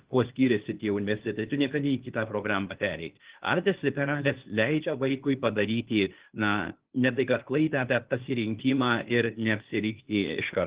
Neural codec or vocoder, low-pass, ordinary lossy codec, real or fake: codec, 16 kHz, 0.8 kbps, ZipCodec; 3.6 kHz; Opus, 16 kbps; fake